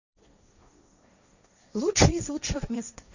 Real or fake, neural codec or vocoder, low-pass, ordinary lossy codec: fake; codec, 16 kHz, 1.1 kbps, Voila-Tokenizer; 7.2 kHz; none